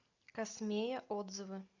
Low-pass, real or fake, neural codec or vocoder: 7.2 kHz; real; none